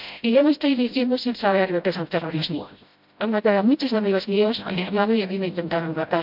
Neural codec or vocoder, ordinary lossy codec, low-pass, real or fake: codec, 16 kHz, 0.5 kbps, FreqCodec, smaller model; MP3, 48 kbps; 5.4 kHz; fake